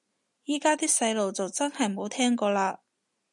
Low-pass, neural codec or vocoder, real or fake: 10.8 kHz; none; real